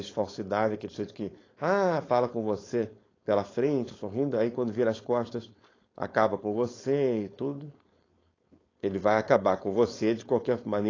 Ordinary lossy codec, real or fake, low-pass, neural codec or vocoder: AAC, 32 kbps; fake; 7.2 kHz; codec, 16 kHz, 4.8 kbps, FACodec